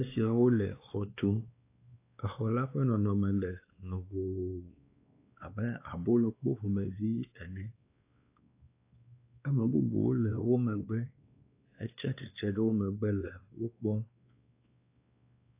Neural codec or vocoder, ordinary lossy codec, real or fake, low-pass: codec, 16 kHz, 2 kbps, X-Codec, WavLM features, trained on Multilingual LibriSpeech; AAC, 24 kbps; fake; 3.6 kHz